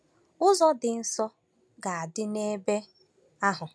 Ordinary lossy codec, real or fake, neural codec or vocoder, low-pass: none; real; none; none